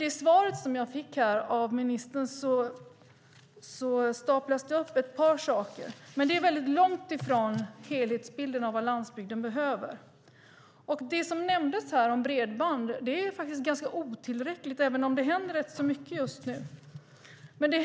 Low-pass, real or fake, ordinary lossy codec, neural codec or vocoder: none; real; none; none